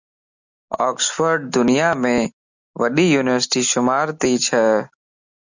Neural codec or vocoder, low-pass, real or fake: none; 7.2 kHz; real